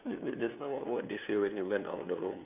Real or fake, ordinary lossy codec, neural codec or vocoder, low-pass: fake; none; codec, 16 kHz, 2 kbps, FunCodec, trained on LibriTTS, 25 frames a second; 3.6 kHz